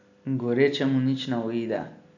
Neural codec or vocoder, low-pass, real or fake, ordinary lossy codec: none; 7.2 kHz; real; none